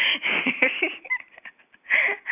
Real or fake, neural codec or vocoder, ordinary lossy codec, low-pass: real; none; none; 3.6 kHz